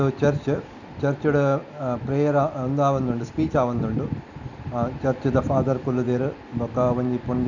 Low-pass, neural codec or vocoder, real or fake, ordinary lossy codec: 7.2 kHz; none; real; none